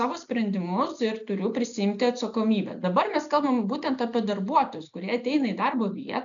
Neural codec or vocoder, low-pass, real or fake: none; 7.2 kHz; real